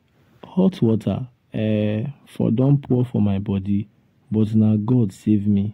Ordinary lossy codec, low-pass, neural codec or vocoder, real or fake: AAC, 48 kbps; 19.8 kHz; none; real